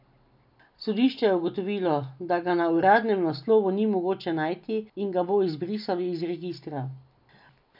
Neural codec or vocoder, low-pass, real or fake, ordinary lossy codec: none; 5.4 kHz; real; none